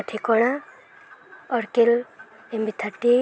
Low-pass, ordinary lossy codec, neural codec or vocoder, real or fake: none; none; none; real